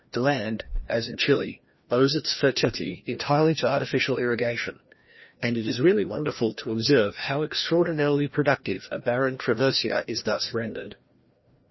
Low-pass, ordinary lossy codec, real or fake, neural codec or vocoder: 7.2 kHz; MP3, 24 kbps; fake; codec, 16 kHz, 1 kbps, FreqCodec, larger model